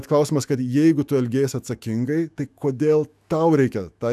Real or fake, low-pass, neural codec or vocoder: fake; 14.4 kHz; autoencoder, 48 kHz, 128 numbers a frame, DAC-VAE, trained on Japanese speech